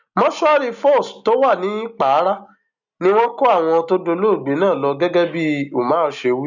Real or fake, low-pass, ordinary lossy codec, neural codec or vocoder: real; 7.2 kHz; none; none